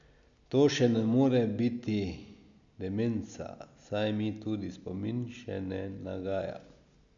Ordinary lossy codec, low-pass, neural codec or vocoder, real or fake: none; 7.2 kHz; none; real